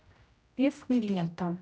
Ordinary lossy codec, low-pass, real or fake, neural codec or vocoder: none; none; fake; codec, 16 kHz, 0.5 kbps, X-Codec, HuBERT features, trained on general audio